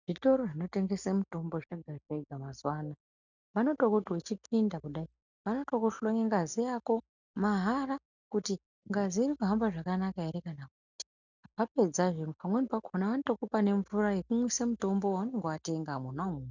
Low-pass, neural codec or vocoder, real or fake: 7.2 kHz; none; real